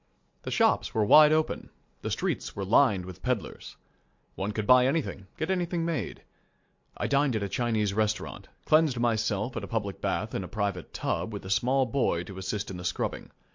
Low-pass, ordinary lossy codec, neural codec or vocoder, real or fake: 7.2 kHz; MP3, 48 kbps; none; real